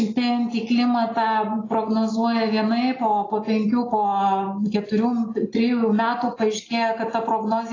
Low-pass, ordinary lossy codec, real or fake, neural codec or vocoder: 7.2 kHz; AAC, 32 kbps; real; none